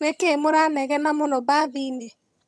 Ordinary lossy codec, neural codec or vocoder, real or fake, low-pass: none; vocoder, 22.05 kHz, 80 mel bands, HiFi-GAN; fake; none